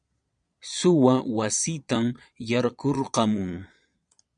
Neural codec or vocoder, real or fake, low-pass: vocoder, 22.05 kHz, 80 mel bands, Vocos; fake; 9.9 kHz